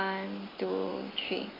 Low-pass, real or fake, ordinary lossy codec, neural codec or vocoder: 5.4 kHz; real; AAC, 24 kbps; none